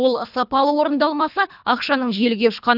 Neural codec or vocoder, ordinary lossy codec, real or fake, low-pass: codec, 24 kHz, 3 kbps, HILCodec; none; fake; 5.4 kHz